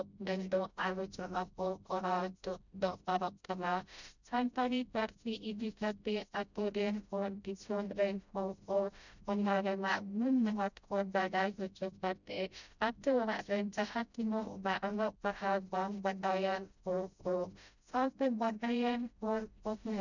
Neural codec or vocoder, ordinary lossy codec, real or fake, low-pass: codec, 16 kHz, 0.5 kbps, FreqCodec, smaller model; none; fake; 7.2 kHz